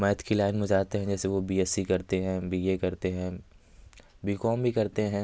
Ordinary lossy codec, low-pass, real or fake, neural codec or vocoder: none; none; real; none